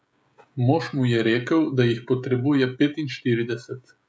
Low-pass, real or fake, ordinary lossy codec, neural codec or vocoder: none; fake; none; codec, 16 kHz, 16 kbps, FreqCodec, smaller model